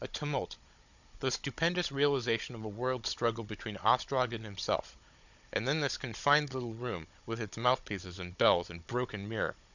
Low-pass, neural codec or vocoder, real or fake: 7.2 kHz; codec, 16 kHz, 16 kbps, FunCodec, trained on Chinese and English, 50 frames a second; fake